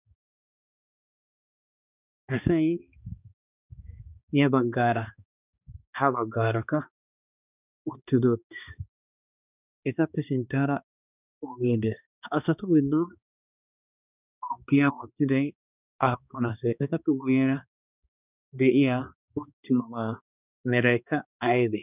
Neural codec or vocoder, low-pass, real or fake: codec, 16 kHz, 2 kbps, X-Codec, HuBERT features, trained on balanced general audio; 3.6 kHz; fake